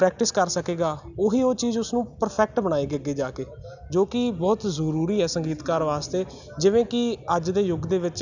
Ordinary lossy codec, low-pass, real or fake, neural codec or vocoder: none; 7.2 kHz; real; none